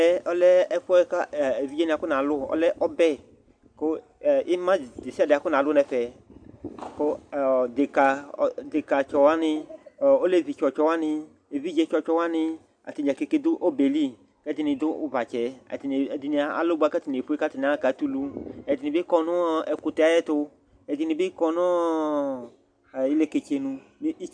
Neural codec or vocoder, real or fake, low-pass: none; real; 9.9 kHz